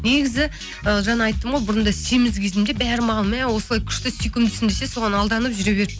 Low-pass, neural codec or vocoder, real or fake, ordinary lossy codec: none; none; real; none